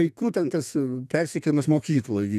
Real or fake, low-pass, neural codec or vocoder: fake; 14.4 kHz; codec, 32 kHz, 1.9 kbps, SNAC